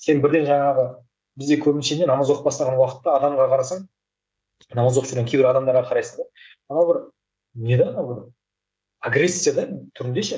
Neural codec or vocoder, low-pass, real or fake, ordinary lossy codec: codec, 16 kHz, 16 kbps, FreqCodec, smaller model; none; fake; none